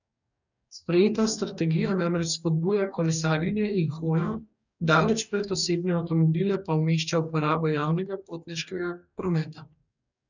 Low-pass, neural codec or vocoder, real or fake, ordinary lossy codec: 7.2 kHz; codec, 44.1 kHz, 2.6 kbps, DAC; fake; none